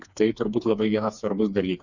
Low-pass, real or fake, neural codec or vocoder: 7.2 kHz; fake; codec, 16 kHz, 4 kbps, FreqCodec, smaller model